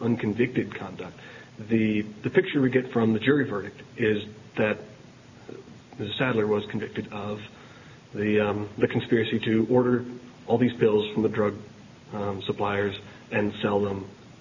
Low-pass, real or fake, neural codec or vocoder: 7.2 kHz; real; none